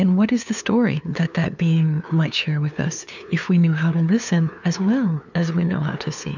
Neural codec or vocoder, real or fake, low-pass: codec, 16 kHz, 2 kbps, FunCodec, trained on LibriTTS, 25 frames a second; fake; 7.2 kHz